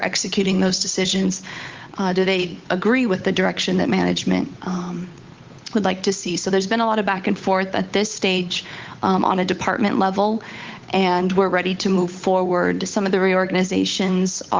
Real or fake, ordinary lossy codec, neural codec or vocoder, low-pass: fake; Opus, 32 kbps; codec, 16 kHz, 8 kbps, FunCodec, trained on Chinese and English, 25 frames a second; 7.2 kHz